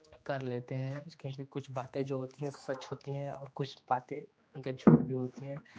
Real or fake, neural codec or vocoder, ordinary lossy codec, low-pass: fake; codec, 16 kHz, 2 kbps, X-Codec, HuBERT features, trained on general audio; none; none